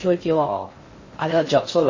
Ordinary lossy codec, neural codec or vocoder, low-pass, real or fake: MP3, 32 kbps; codec, 16 kHz in and 24 kHz out, 0.6 kbps, FocalCodec, streaming, 4096 codes; 7.2 kHz; fake